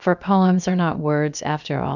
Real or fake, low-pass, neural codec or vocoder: fake; 7.2 kHz; codec, 16 kHz, about 1 kbps, DyCAST, with the encoder's durations